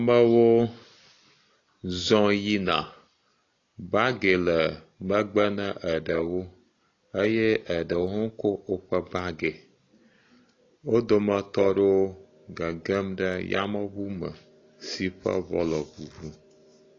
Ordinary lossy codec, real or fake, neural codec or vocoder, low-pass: AAC, 32 kbps; real; none; 7.2 kHz